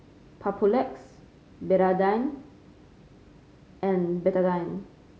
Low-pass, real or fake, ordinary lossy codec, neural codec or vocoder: none; real; none; none